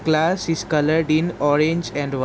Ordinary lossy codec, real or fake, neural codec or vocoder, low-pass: none; real; none; none